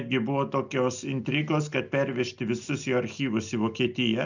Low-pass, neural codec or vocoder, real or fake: 7.2 kHz; none; real